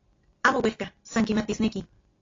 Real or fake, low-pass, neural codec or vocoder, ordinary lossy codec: real; 7.2 kHz; none; AAC, 32 kbps